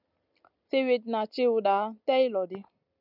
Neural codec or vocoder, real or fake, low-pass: none; real; 5.4 kHz